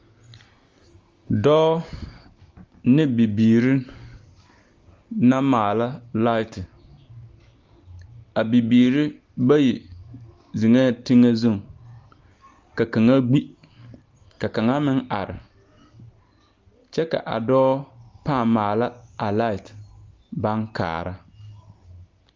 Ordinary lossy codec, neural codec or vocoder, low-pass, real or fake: Opus, 32 kbps; none; 7.2 kHz; real